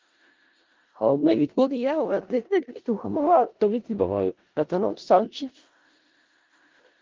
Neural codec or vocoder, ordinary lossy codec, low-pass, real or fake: codec, 16 kHz in and 24 kHz out, 0.4 kbps, LongCat-Audio-Codec, four codebook decoder; Opus, 32 kbps; 7.2 kHz; fake